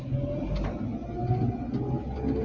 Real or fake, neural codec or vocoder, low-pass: real; none; 7.2 kHz